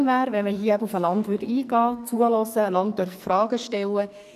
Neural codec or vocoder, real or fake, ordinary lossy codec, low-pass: codec, 44.1 kHz, 2.6 kbps, SNAC; fake; none; 14.4 kHz